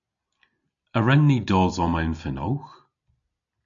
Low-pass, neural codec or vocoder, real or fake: 7.2 kHz; none; real